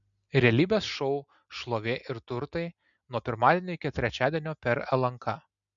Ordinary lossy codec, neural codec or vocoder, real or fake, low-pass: MP3, 96 kbps; none; real; 7.2 kHz